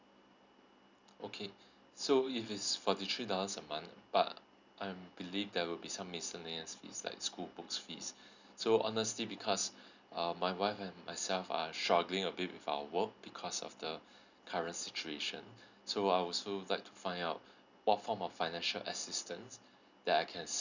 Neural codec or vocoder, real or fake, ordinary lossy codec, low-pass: none; real; none; 7.2 kHz